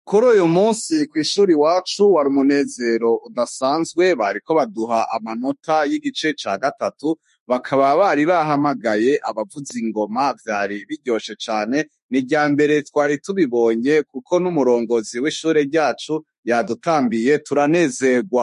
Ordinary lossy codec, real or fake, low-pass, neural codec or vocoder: MP3, 48 kbps; fake; 14.4 kHz; autoencoder, 48 kHz, 32 numbers a frame, DAC-VAE, trained on Japanese speech